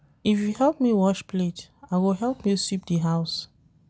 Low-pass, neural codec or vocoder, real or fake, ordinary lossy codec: none; none; real; none